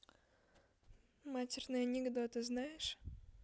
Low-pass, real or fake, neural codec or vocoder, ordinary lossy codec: none; real; none; none